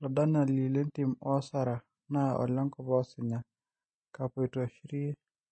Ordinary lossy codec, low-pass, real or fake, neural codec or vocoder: MP3, 32 kbps; 9.9 kHz; real; none